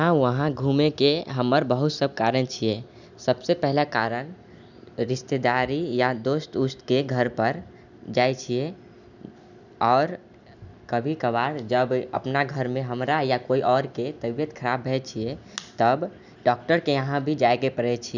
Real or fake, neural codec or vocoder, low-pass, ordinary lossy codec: real; none; 7.2 kHz; none